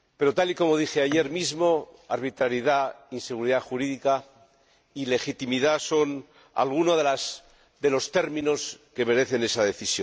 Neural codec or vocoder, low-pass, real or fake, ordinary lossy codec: none; none; real; none